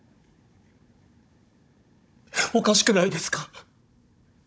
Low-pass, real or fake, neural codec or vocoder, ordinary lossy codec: none; fake; codec, 16 kHz, 16 kbps, FunCodec, trained on Chinese and English, 50 frames a second; none